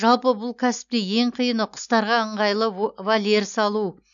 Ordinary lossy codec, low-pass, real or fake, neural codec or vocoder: none; 7.2 kHz; real; none